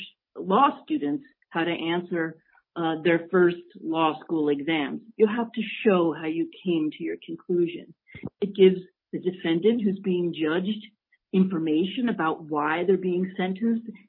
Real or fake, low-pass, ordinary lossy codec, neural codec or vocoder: real; 5.4 kHz; MP3, 24 kbps; none